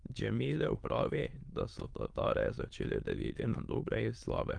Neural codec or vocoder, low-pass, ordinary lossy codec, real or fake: autoencoder, 22.05 kHz, a latent of 192 numbers a frame, VITS, trained on many speakers; 9.9 kHz; Opus, 32 kbps; fake